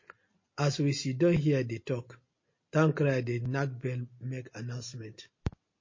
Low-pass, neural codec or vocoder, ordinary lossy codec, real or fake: 7.2 kHz; none; MP3, 32 kbps; real